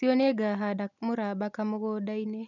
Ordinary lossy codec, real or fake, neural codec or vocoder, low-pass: none; real; none; 7.2 kHz